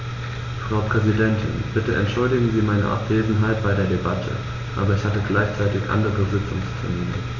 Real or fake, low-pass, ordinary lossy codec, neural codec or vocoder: real; 7.2 kHz; none; none